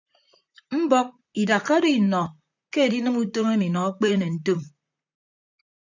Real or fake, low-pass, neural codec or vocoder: fake; 7.2 kHz; vocoder, 44.1 kHz, 128 mel bands, Pupu-Vocoder